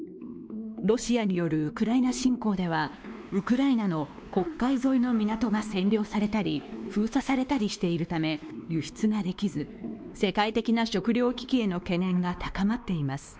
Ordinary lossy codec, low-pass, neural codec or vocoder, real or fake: none; none; codec, 16 kHz, 2 kbps, X-Codec, WavLM features, trained on Multilingual LibriSpeech; fake